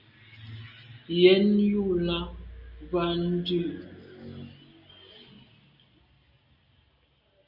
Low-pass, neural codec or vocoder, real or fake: 5.4 kHz; none; real